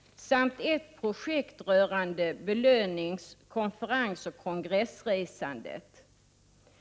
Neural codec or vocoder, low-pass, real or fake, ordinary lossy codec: none; none; real; none